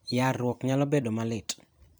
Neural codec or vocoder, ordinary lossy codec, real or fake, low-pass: vocoder, 44.1 kHz, 128 mel bands every 256 samples, BigVGAN v2; none; fake; none